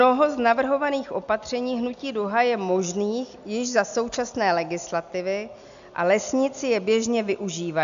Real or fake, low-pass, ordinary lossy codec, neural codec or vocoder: real; 7.2 kHz; AAC, 96 kbps; none